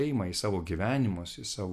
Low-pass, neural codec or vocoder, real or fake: 14.4 kHz; none; real